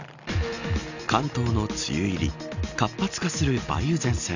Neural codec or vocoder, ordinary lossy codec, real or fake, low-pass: none; none; real; 7.2 kHz